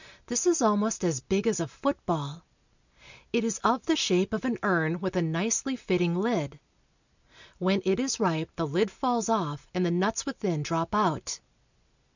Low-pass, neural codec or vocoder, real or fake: 7.2 kHz; none; real